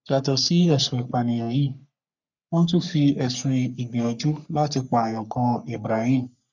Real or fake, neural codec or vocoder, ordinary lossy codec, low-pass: fake; codec, 44.1 kHz, 3.4 kbps, Pupu-Codec; none; 7.2 kHz